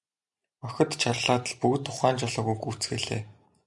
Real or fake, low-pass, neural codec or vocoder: real; 10.8 kHz; none